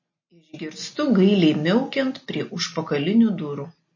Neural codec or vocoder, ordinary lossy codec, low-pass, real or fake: none; MP3, 32 kbps; 7.2 kHz; real